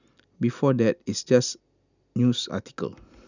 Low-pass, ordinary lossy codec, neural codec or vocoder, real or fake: 7.2 kHz; none; none; real